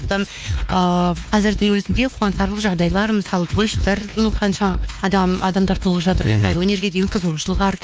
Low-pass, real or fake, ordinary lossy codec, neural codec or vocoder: none; fake; none; codec, 16 kHz, 2 kbps, X-Codec, WavLM features, trained on Multilingual LibriSpeech